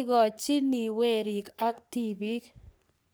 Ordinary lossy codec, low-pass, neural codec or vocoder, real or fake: none; none; codec, 44.1 kHz, 3.4 kbps, Pupu-Codec; fake